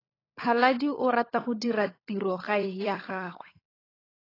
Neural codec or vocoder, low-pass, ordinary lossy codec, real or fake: codec, 16 kHz, 16 kbps, FunCodec, trained on LibriTTS, 50 frames a second; 5.4 kHz; AAC, 24 kbps; fake